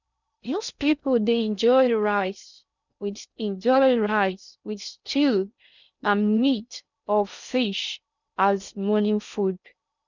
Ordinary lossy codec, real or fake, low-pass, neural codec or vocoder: none; fake; 7.2 kHz; codec, 16 kHz in and 24 kHz out, 0.6 kbps, FocalCodec, streaming, 2048 codes